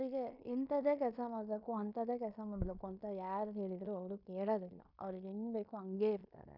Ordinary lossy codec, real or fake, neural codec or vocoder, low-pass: none; fake; codec, 16 kHz in and 24 kHz out, 0.9 kbps, LongCat-Audio-Codec, fine tuned four codebook decoder; 5.4 kHz